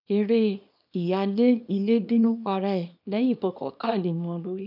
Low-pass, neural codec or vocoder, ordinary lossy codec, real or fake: 5.4 kHz; codec, 24 kHz, 0.9 kbps, WavTokenizer, small release; none; fake